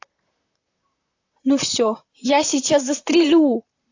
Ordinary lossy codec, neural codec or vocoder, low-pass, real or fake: AAC, 48 kbps; vocoder, 44.1 kHz, 128 mel bands, Pupu-Vocoder; 7.2 kHz; fake